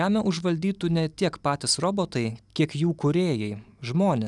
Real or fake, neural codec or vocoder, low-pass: real; none; 10.8 kHz